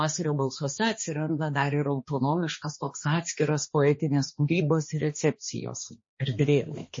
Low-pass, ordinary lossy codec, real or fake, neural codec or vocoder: 7.2 kHz; MP3, 32 kbps; fake; codec, 16 kHz, 2 kbps, X-Codec, HuBERT features, trained on balanced general audio